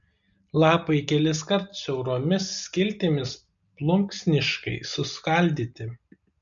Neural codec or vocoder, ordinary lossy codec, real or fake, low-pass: none; MP3, 96 kbps; real; 7.2 kHz